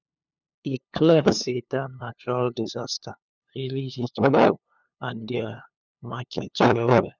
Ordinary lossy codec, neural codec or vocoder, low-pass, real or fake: none; codec, 16 kHz, 2 kbps, FunCodec, trained on LibriTTS, 25 frames a second; 7.2 kHz; fake